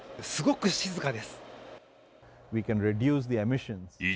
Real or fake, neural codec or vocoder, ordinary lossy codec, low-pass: real; none; none; none